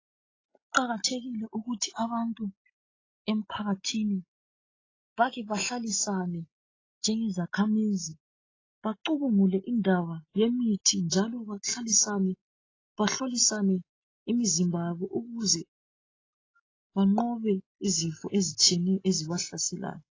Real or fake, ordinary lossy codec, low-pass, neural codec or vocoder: real; AAC, 32 kbps; 7.2 kHz; none